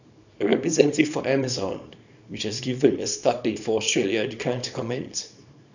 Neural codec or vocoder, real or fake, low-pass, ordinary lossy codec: codec, 24 kHz, 0.9 kbps, WavTokenizer, small release; fake; 7.2 kHz; none